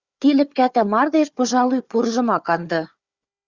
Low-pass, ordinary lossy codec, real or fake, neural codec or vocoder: 7.2 kHz; Opus, 64 kbps; fake; codec, 16 kHz, 4 kbps, FunCodec, trained on Chinese and English, 50 frames a second